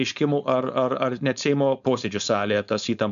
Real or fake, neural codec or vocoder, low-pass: fake; codec, 16 kHz, 4.8 kbps, FACodec; 7.2 kHz